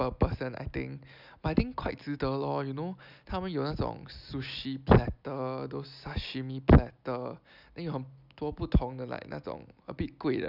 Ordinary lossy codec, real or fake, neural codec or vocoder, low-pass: none; real; none; 5.4 kHz